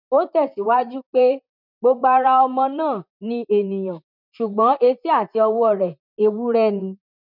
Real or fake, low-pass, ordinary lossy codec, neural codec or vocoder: fake; 5.4 kHz; none; vocoder, 44.1 kHz, 128 mel bands, Pupu-Vocoder